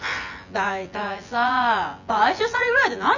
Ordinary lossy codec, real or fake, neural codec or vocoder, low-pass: none; fake; vocoder, 24 kHz, 100 mel bands, Vocos; 7.2 kHz